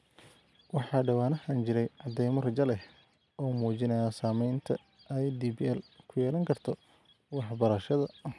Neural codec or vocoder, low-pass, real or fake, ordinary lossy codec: none; none; real; none